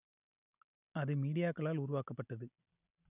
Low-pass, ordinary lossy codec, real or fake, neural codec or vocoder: 3.6 kHz; none; real; none